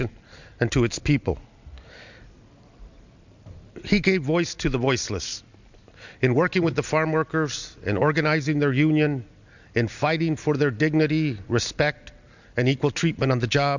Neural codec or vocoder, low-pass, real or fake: none; 7.2 kHz; real